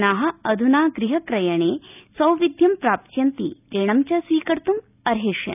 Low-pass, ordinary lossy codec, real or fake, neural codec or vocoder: 3.6 kHz; none; real; none